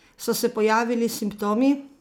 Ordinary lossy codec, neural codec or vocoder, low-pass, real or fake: none; none; none; real